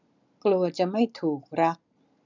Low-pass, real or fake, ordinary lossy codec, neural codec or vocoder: 7.2 kHz; real; none; none